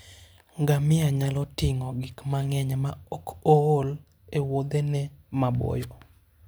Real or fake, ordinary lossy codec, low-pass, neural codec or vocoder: real; none; none; none